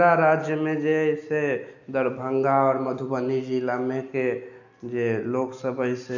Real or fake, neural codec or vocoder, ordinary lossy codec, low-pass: real; none; none; 7.2 kHz